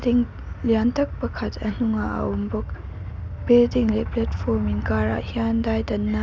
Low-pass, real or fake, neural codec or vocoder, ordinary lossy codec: none; real; none; none